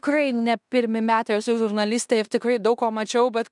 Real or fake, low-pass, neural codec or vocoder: fake; 10.8 kHz; codec, 16 kHz in and 24 kHz out, 0.9 kbps, LongCat-Audio-Codec, fine tuned four codebook decoder